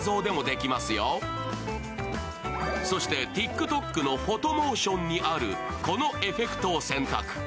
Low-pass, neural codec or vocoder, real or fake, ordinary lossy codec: none; none; real; none